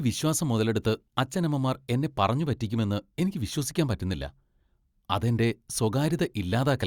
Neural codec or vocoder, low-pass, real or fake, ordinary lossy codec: none; 19.8 kHz; real; Opus, 64 kbps